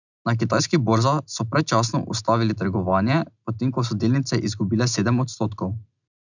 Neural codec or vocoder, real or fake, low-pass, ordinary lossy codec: none; real; 7.2 kHz; none